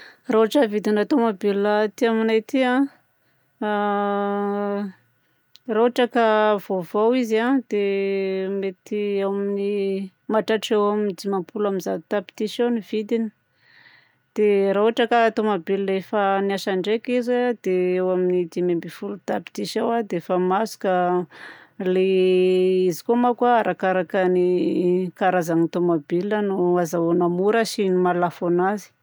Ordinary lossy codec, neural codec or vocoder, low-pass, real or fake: none; none; none; real